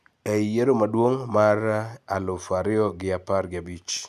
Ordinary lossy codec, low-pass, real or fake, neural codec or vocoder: none; 14.4 kHz; real; none